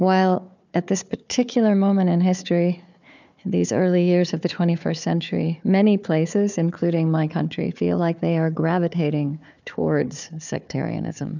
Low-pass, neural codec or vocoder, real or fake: 7.2 kHz; codec, 16 kHz, 4 kbps, FunCodec, trained on Chinese and English, 50 frames a second; fake